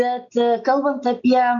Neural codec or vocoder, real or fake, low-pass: none; real; 7.2 kHz